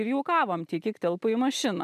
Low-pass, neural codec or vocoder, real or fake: 14.4 kHz; none; real